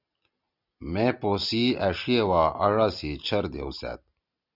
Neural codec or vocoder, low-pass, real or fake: none; 5.4 kHz; real